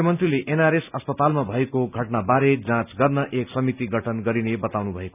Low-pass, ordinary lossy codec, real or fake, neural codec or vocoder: 3.6 kHz; none; real; none